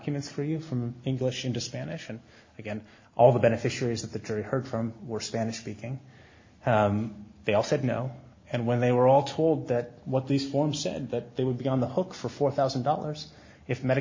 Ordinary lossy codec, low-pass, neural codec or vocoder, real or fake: MP3, 32 kbps; 7.2 kHz; none; real